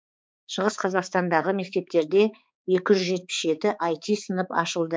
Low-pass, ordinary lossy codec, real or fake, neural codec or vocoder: none; none; fake; codec, 16 kHz, 4 kbps, X-Codec, HuBERT features, trained on balanced general audio